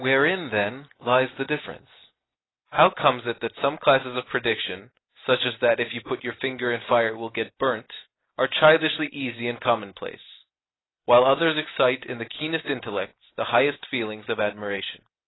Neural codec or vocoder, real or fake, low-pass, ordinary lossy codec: none; real; 7.2 kHz; AAC, 16 kbps